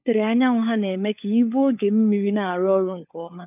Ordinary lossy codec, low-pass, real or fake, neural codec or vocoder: none; 3.6 kHz; fake; codec, 16 kHz, 4 kbps, FunCodec, trained on LibriTTS, 50 frames a second